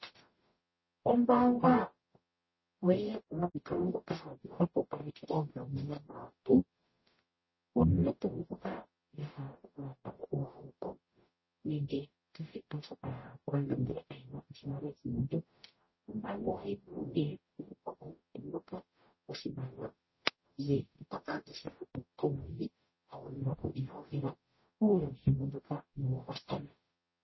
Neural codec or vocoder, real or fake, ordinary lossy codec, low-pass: codec, 44.1 kHz, 0.9 kbps, DAC; fake; MP3, 24 kbps; 7.2 kHz